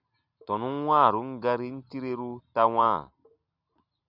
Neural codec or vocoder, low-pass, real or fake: none; 5.4 kHz; real